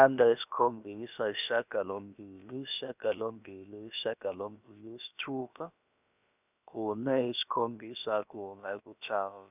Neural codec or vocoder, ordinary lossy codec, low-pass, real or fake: codec, 16 kHz, about 1 kbps, DyCAST, with the encoder's durations; none; 3.6 kHz; fake